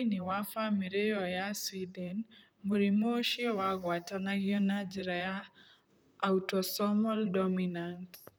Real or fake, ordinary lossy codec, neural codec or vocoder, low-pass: fake; none; vocoder, 44.1 kHz, 128 mel bands, Pupu-Vocoder; none